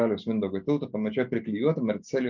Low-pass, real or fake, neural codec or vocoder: 7.2 kHz; real; none